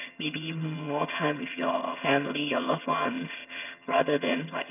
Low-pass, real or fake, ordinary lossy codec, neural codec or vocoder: 3.6 kHz; fake; none; vocoder, 22.05 kHz, 80 mel bands, HiFi-GAN